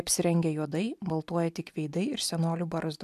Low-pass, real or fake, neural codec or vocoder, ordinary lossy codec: 14.4 kHz; real; none; MP3, 96 kbps